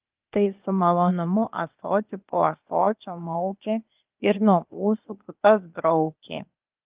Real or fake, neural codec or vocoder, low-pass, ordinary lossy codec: fake; codec, 16 kHz, 0.8 kbps, ZipCodec; 3.6 kHz; Opus, 24 kbps